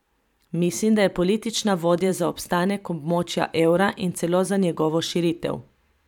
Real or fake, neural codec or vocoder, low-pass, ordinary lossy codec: fake; vocoder, 44.1 kHz, 128 mel bands every 512 samples, BigVGAN v2; 19.8 kHz; none